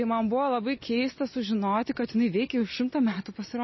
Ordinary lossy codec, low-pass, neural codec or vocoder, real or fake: MP3, 24 kbps; 7.2 kHz; none; real